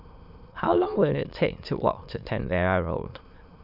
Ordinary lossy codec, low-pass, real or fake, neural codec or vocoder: none; 5.4 kHz; fake; autoencoder, 22.05 kHz, a latent of 192 numbers a frame, VITS, trained on many speakers